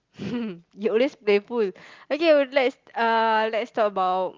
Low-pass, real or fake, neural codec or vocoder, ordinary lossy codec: 7.2 kHz; real; none; Opus, 32 kbps